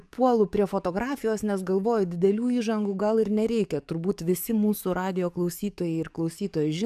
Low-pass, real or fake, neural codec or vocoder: 14.4 kHz; fake; codec, 44.1 kHz, 7.8 kbps, DAC